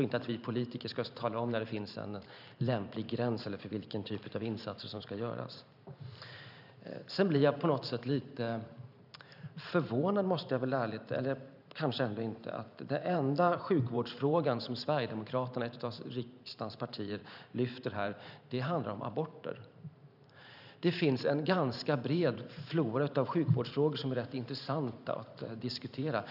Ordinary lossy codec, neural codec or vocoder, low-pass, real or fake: none; vocoder, 44.1 kHz, 128 mel bands every 512 samples, BigVGAN v2; 5.4 kHz; fake